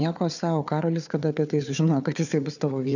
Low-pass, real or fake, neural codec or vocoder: 7.2 kHz; fake; codec, 16 kHz, 4 kbps, FunCodec, trained on Chinese and English, 50 frames a second